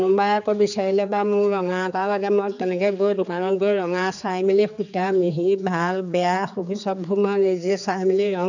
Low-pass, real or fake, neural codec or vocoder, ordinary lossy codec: 7.2 kHz; fake; codec, 16 kHz, 4 kbps, X-Codec, HuBERT features, trained on general audio; none